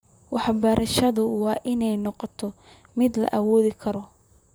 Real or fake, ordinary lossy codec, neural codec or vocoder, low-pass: fake; none; vocoder, 44.1 kHz, 128 mel bands, Pupu-Vocoder; none